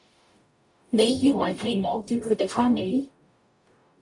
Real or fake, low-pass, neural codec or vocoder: fake; 10.8 kHz; codec, 44.1 kHz, 0.9 kbps, DAC